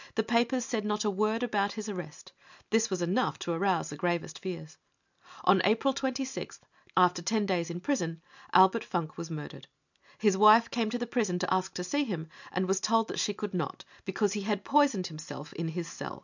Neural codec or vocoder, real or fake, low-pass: none; real; 7.2 kHz